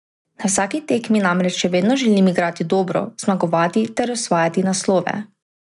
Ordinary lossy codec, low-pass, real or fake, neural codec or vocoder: none; 10.8 kHz; real; none